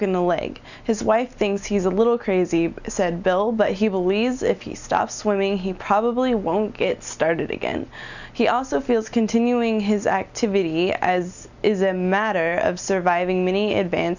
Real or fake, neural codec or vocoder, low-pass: real; none; 7.2 kHz